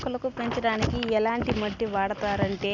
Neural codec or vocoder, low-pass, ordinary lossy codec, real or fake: none; 7.2 kHz; none; real